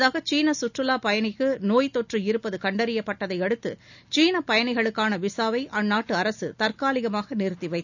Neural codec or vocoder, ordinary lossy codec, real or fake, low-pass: none; none; real; 7.2 kHz